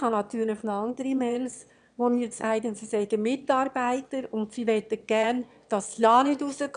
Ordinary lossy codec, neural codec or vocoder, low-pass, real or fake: none; autoencoder, 22.05 kHz, a latent of 192 numbers a frame, VITS, trained on one speaker; 9.9 kHz; fake